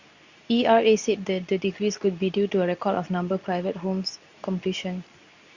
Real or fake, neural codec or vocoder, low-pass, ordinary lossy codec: fake; codec, 24 kHz, 0.9 kbps, WavTokenizer, medium speech release version 2; 7.2 kHz; Opus, 64 kbps